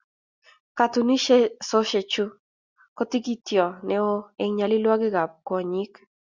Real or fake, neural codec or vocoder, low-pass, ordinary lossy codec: real; none; 7.2 kHz; Opus, 64 kbps